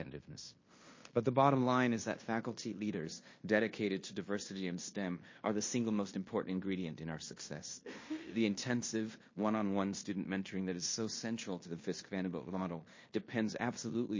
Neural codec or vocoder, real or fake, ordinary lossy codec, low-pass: codec, 16 kHz in and 24 kHz out, 0.9 kbps, LongCat-Audio-Codec, fine tuned four codebook decoder; fake; MP3, 32 kbps; 7.2 kHz